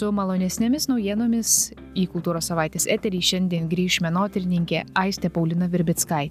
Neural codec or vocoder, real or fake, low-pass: none; real; 14.4 kHz